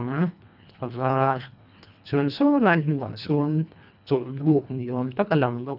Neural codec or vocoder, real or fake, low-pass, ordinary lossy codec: codec, 24 kHz, 1.5 kbps, HILCodec; fake; 5.4 kHz; none